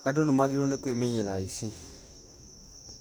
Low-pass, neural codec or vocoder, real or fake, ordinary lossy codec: none; codec, 44.1 kHz, 2.6 kbps, DAC; fake; none